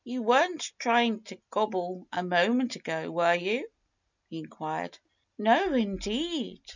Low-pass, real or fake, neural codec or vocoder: 7.2 kHz; real; none